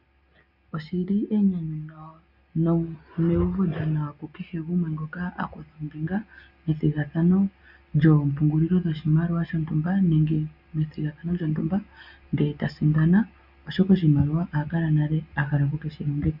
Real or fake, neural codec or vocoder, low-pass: real; none; 5.4 kHz